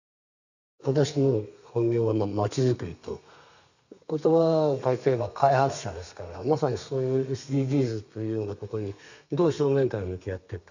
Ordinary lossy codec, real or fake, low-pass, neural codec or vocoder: none; fake; 7.2 kHz; codec, 32 kHz, 1.9 kbps, SNAC